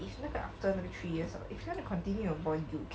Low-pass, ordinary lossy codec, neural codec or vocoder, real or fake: none; none; none; real